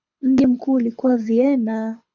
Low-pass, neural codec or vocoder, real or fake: 7.2 kHz; codec, 24 kHz, 6 kbps, HILCodec; fake